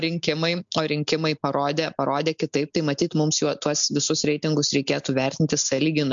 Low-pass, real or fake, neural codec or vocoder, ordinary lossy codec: 7.2 kHz; real; none; MP3, 64 kbps